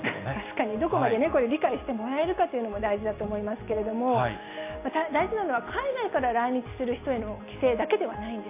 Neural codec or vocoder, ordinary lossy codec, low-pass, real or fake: none; AAC, 24 kbps; 3.6 kHz; real